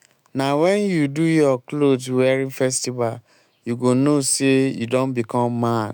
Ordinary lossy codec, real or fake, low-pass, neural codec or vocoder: none; fake; none; autoencoder, 48 kHz, 128 numbers a frame, DAC-VAE, trained on Japanese speech